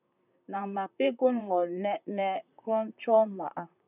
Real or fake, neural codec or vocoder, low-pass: fake; vocoder, 44.1 kHz, 128 mel bands, Pupu-Vocoder; 3.6 kHz